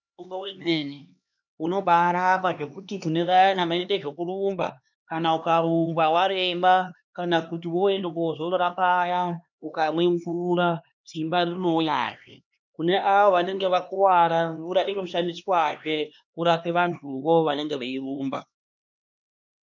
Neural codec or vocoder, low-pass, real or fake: codec, 16 kHz, 2 kbps, X-Codec, HuBERT features, trained on LibriSpeech; 7.2 kHz; fake